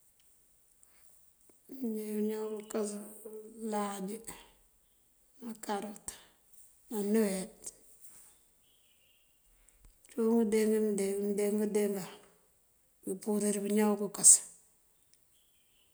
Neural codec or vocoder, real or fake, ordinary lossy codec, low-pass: none; real; none; none